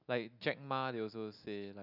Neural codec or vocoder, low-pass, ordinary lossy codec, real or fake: none; 5.4 kHz; none; real